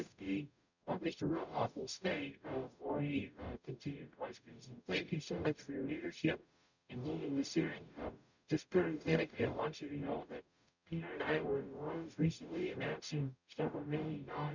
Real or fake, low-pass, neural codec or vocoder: fake; 7.2 kHz; codec, 44.1 kHz, 0.9 kbps, DAC